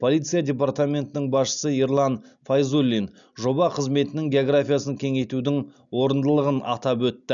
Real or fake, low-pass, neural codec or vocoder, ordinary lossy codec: real; 7.2 kHz; none; none